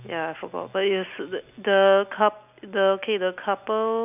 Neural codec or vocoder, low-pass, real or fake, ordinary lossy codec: none; 3.6 kHz; real; none